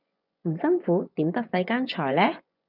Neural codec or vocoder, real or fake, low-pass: vocoder, 24 kHz, 100 mel bands, Vocos; fake; 5.4 kHz